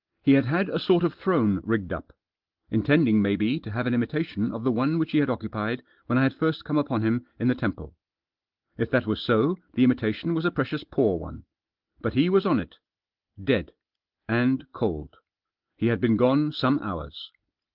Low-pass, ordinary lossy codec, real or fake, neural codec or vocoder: 5.4 kHz; Opus, 16 kbps; real; none